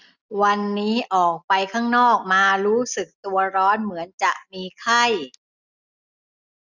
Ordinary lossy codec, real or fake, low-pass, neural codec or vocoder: none; real; 7.2 kHz; none